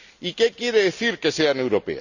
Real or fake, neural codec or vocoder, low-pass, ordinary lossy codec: real; none; 7.2 kHz; none